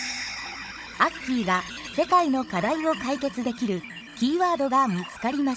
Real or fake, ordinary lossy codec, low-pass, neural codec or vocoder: fake; none; none; codec, 16 kHz, 16 kbps, FunCodec, trained on Chinese and English, 50 frames a second